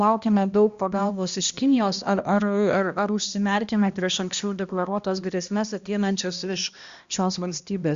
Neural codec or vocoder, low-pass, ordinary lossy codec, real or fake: codec, 16 kHz, 1 kbps, X-Codec, HuBERT features, trained on balanced general audio; 7.2 kHz; Opus, 64 kbps; fake